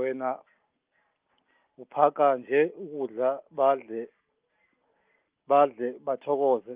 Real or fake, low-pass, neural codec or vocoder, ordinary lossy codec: real; 3.6 kHz; none; Opus, 24 kbps